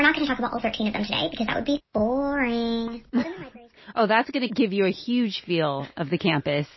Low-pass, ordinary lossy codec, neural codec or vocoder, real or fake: 7.2 kHz; MP3, 24 kbps; none; real